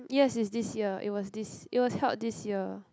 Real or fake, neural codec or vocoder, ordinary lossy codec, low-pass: real; none; none; none